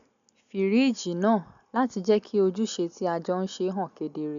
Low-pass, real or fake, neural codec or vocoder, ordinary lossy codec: 7.2 kHz; real; none; none